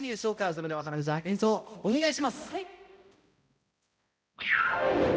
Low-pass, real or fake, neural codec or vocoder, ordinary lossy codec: none; fake; codec, 16 kHz, 0.5 kbps, X-Codec, HuBERT features, trained on balanced general audio; none